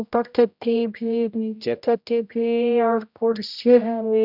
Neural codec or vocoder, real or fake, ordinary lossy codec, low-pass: codec, 16 kHz, 0.5 kbps, X-Codec, HuBERT features, trained on general audio; fake; none; 5.4 kHz